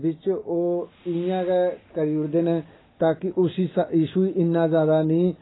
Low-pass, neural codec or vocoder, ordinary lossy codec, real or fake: 7.2 kHz; none; AAC, 16 kbps; real